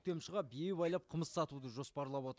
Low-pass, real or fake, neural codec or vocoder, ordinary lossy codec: none; real; none; none